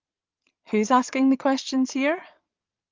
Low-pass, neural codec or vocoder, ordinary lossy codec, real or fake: 7.2 kHz; vocoder, 22.05 kHz, 80 mel bands, Vocos; Opus, 32 kbps; fake